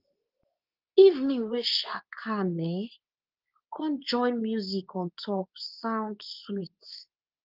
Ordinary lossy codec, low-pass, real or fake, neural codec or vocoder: Opus, 24 kbps; 5.4 kHz; fake; codec, 24 kHz, 0.9 kbps, WavTokenizer, medium speech release version 2